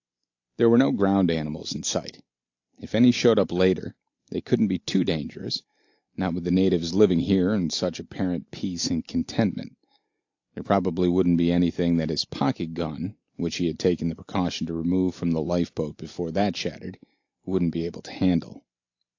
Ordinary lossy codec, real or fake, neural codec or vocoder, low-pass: AAC, 48 kbps; real; none; 7.2 kHz